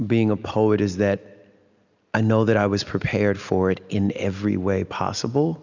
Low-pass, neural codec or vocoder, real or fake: 7.2 kHz; none; real